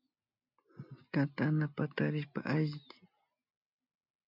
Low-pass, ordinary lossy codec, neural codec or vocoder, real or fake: 5.4 kHz; MP3, 48 kbps; none; real